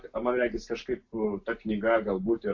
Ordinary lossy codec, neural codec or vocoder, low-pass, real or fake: AAC, 48 kbps; none; 7.2 kHz; real